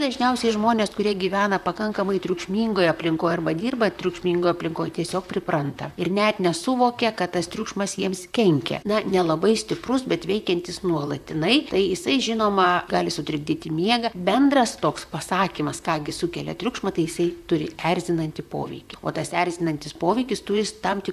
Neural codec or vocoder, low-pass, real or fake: vocoder, 44.1 kHz, 128 mel bands, Pupu-Vocoder; 14.4 kHz; fake